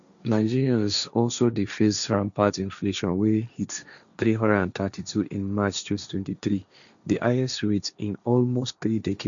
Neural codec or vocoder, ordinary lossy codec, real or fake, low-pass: codec, 16 kHz, 1.1 kbps, Voila-Tokenizer; none; fake; 7.2 kHz